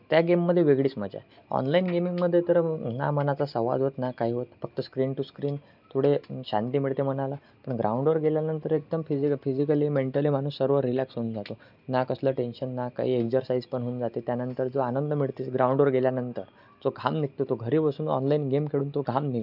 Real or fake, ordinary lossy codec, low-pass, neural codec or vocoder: real; none; 5.4 kHz; none